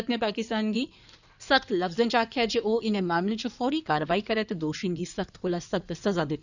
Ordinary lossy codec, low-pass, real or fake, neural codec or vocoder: none; 7.2 kHz; fake; codec, 16 kHz in and 24 kHz out, 2.2 kbps, FireRedTTS-2 codec